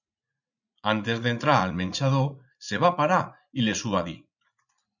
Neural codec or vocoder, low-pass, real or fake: vocoder, 44.1 kHz, 80 mel bands, Vocos; 7.2 kHz; fake